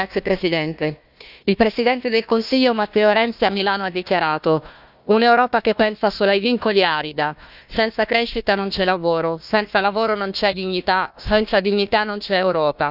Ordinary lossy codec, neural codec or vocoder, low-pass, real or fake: none; codec, 16 kHz, 1 kbps, FunCodec, trained on Chinese and English, 50 frames a second; 5.4 kHz; fake